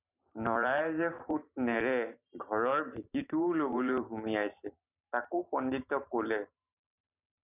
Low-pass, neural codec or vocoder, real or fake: 3.6 kHz; vocoder, 44.1 kHz, 128 mel bands every 512 samples, BigVGAN v2; fake